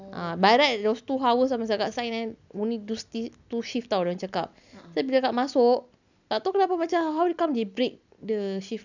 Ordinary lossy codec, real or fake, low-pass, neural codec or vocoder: none; real; 7.2 kHz; none